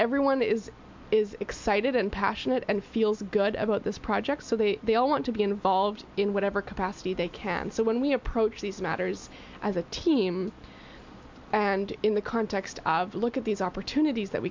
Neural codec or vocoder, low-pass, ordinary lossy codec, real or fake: none; 7.2 kHz; MP3, 64 kbps; real